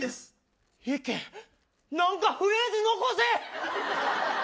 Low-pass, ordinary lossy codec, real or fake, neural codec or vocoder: none; none; real; none